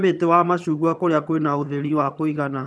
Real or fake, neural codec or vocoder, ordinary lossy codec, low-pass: fake; vocoder, 22.05 kHz, 80 mel bands, HiFi-GAN; none; none